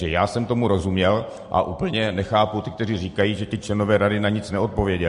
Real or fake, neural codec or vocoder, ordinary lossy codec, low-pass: real; none; MP3, 48 kbps; 14.4 kHz